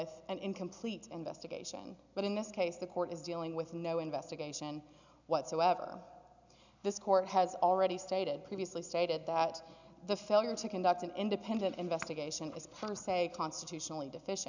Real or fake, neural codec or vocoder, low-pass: real; none; 7.2 kHz